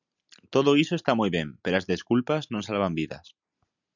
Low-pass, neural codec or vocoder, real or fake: 7.2 kHz; none; real